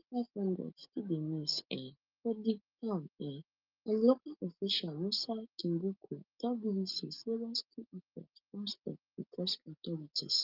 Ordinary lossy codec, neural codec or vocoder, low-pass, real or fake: Opus, 24 kbps; none; 5.4 kHz; real